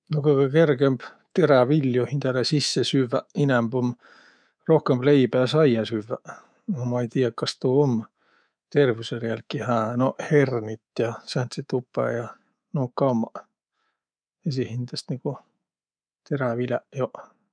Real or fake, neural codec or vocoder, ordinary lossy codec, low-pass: fake; codec, 24 kHz, 3.1 kbps, DualCodec; none; 9.9 kHz